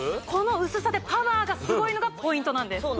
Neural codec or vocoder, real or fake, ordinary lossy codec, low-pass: none; real; none; none